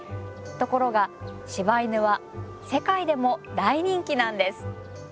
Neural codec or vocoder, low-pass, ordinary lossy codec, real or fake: none; none; none; real